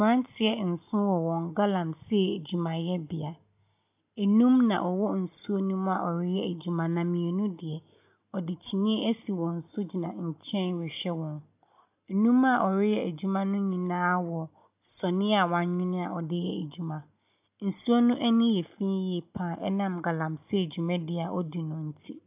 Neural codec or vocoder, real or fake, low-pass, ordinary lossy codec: none; real; 3.6 kHz; AAC, 32 kbps